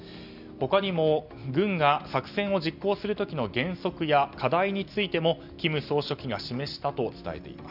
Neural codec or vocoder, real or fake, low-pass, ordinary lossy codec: none; real; 5.4 kHz; none